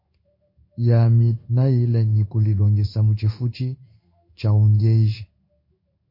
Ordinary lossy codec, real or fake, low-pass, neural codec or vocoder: MP3, 32 kbps; fake; 5.4 kHz; codec, 16 kHz in and 24 kHz out, 1 kbps, XY-Tokenizer